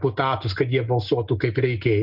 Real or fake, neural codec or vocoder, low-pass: real; none; 5.4 kHz